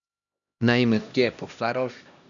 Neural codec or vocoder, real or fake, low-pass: codec, 16 kHz, 1 kbps, X-Codec, HuBERT features, trained on LibriSpeech; fake; 7.2 kHz